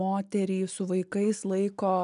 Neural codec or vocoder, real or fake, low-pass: none; real; 10.8 kHz